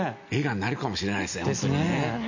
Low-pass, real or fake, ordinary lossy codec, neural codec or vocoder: 7.2 kHz; real; none; none